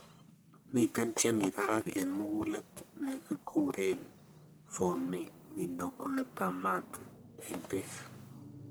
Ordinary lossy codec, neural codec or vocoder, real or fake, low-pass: none; codec, 44.1 kHz, 1.7 kbps, Pupu-Codec; fake; none